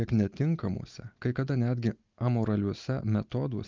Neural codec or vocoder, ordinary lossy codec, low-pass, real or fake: none; Opus, 24 kbps; 7.2 kHz; real